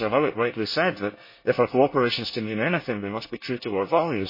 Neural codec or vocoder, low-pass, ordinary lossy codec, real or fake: codec, 24 kHz, 1 kbps, SNAC; 5.4 kHz; MP3, 24 kbps; fake